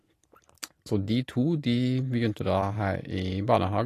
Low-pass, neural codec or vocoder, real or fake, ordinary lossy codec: 14.4 kHz; vocoder, 44.1 kHz, 128 mel bands every 512 samples, BigVGAN v2; fake; AAC, 48 kbps